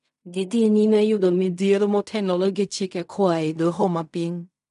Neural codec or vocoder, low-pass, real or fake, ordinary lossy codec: codec, 16 kHz in and 24 kHz out, 0.4 kbps, LongCat-Audio-Codec, fine tuned four codebook decoder; 10.8 kHz; fake; none